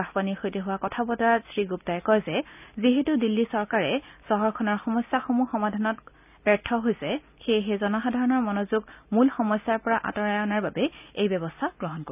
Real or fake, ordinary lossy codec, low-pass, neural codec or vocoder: real; none; 3.6 kHz; none